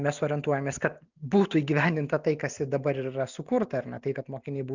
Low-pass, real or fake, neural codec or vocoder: 7.2 kHz; real; none